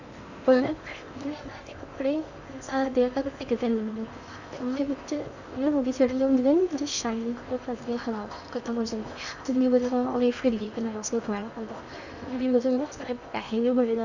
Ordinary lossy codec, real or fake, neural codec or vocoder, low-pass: none; fake; codec, 16 kHz in and 24 kHz out, 0.8 kbps, FocalCodec, streaming, 65536 codes; 7.2 kHz